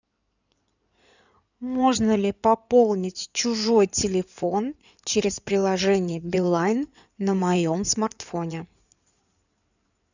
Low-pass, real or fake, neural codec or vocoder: 7.2 kHz; fake; codec, 16 kHz in and 24 kHz out, 2.2 kbps, FireRedTTS-2 codec